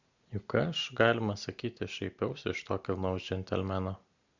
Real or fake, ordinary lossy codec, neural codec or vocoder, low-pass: real; MP3, 64 kbps; none; 7.2 kHz